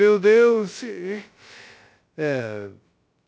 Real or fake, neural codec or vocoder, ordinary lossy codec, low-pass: fake; codec, 16 kHz, 0.2 kbps, FocalCodec; none; none